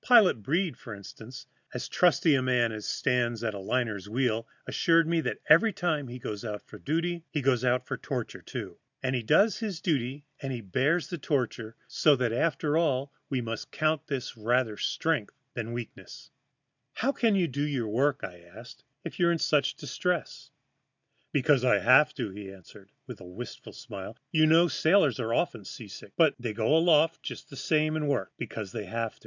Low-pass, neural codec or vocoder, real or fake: 7.2 kHz; none; real